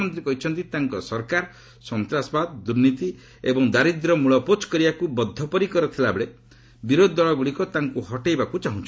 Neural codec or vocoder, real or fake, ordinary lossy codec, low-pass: none; real; none; none